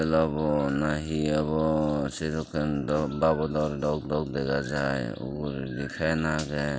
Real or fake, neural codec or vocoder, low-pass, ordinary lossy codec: real; none; none; none